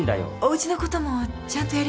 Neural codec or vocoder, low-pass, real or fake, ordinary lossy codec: none; none; real; none